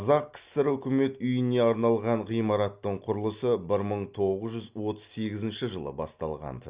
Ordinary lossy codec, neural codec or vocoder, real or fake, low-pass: Opus, 64 kbps; none; real; 3.6 kHz